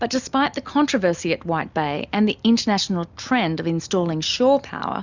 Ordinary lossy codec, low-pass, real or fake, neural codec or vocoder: Opus, 64 kbps; 7.2 kHz; real; none